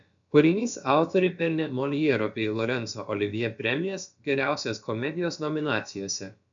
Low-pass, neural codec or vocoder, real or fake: 7.2 kHz; codec, 16 kHz, about 1 kbps, DyCAST, with the encoder's durations; fake